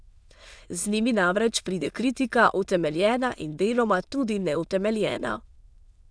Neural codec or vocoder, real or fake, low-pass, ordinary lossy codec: autoencoder, 22.05 kHz, a latent of 192 numbers a frame, VITS, trained on many speakers; fake; none; none